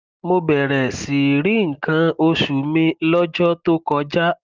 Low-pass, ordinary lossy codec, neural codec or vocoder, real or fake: 7.2 kHz; Opus, 32 kbps; none; real